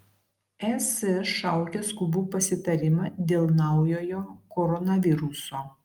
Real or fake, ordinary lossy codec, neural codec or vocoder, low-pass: real; Opus, 32 kbps; none; 19.8 kHz